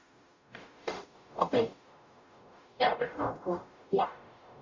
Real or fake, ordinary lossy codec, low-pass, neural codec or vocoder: fake; MP3, 64 kbps; 7.2 kHz; codec, 44.1 kHz, 0.9 kbps, DAC